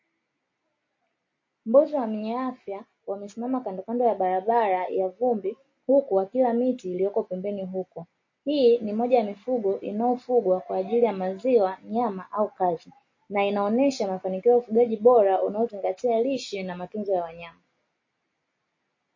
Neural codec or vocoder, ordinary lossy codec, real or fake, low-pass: none; MP3, 32 kbps; real; 7.2 kHz